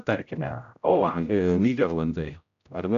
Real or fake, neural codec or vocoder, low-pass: fake; codec, 16 kHz, 0.5 kbps, X-Codec, HuBERT features, trained on general audio; 7.2 kHz